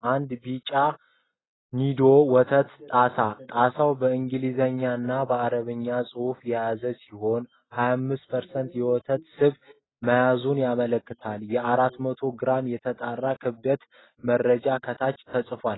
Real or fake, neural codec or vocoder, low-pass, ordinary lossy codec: real; none; 7.2 kHz; AAC, 16 kbps